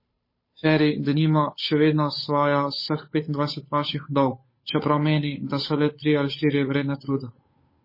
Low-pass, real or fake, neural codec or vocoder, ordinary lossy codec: 5.4 kHz; fake; codec, 16 kHz, 8 kbps, FunCodec, trained on Chinese and English, 25 frames a second; MP3, 24 kbps